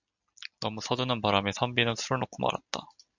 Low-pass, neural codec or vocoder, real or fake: 7.2 kHz; none; real